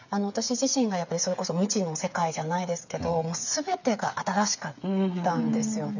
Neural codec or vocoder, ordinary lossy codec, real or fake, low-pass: codec, 16 kHz, 8 kbps, FreqCodec, smaller model; none; fake; 7.2 kHz